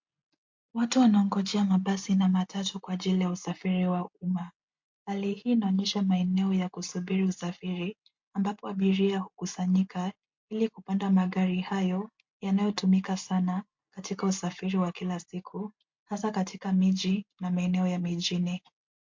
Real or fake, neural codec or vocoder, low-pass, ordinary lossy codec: real; none; 7.2 kHz; MP3, 48 kbps